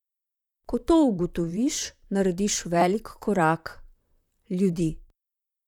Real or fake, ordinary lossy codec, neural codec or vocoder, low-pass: fake; none; vocoder, 44.1 kHz, 128 mel bands, Pupu-Vocoder; 19.8 kHz